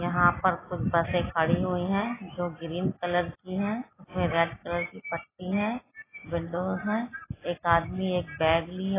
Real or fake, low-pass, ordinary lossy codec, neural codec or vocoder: real; 3.6 kHz; AAC, 16 kbps; none